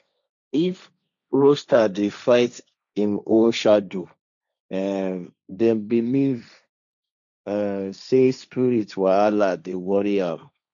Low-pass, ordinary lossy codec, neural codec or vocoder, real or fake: 7.2 kHz; none; codec, 16 kHz, 1.1 kbps, Voila-Tokenizer; fake